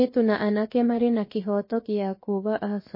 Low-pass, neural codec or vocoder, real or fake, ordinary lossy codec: 5.4 kHz; codec, 16 kHz, 0.7 kbps, FocalCodec; fake; MP3, 24 kbps